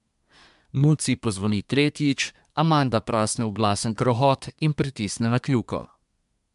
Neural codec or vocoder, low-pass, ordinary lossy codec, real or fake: codec, 24 kHz, 1 kbps, SNAC; 10.8 kHz; MP3, 96 kbps; fake